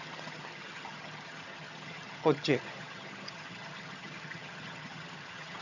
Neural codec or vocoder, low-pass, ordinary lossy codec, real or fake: vocoder, 22.05 kHz, 80 mel bands, HiFi-GAN; 7.2 kHz; none; fake